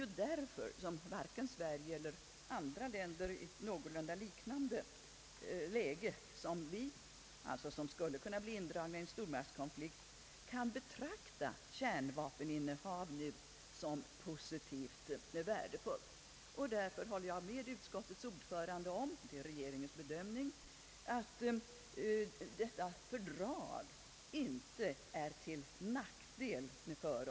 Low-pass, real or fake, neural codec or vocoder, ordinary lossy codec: none; real; none; none